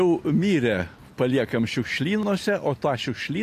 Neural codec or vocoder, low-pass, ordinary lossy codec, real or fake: none; 14.4 kHz; AAC, 64 kbps; real